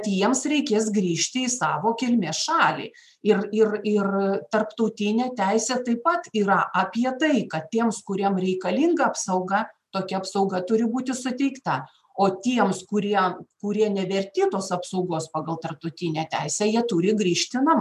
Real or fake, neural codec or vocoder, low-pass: real; none; 14.4 kHz